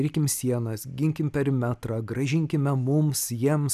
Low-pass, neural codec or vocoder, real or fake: 14.4 kHz; none; real